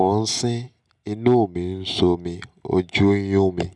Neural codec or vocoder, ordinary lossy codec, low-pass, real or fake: none; none; 9.9 kHz; real